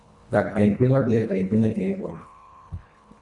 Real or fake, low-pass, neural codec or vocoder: fake; 10.8 kHz; codec, 24 kHz, 1.5 kbps, HILCodec